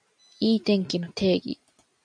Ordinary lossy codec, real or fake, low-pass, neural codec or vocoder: MP3, 96 kbps; real; 9.9 kHz; none